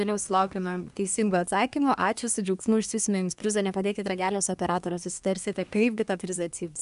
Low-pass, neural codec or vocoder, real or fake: 10.8 kHz; codec, 24 kHz, 1 kbps, SNAC; fake